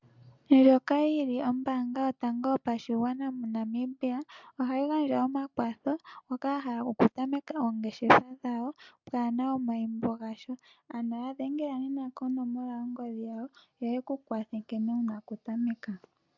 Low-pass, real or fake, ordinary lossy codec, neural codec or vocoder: 7.2 kHz; real; MP3, 64 kbps; none